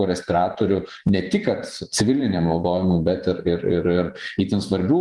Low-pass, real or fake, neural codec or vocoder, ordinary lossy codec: 10.8 kHz; fake; vocoder, 24 kHz, 100 mel bands, Vocos; Opus, 32 kbps